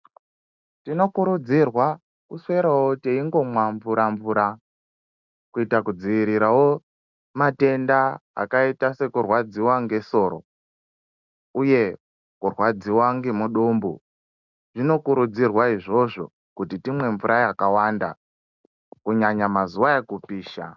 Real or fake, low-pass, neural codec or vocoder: real; 7.2 kHz; none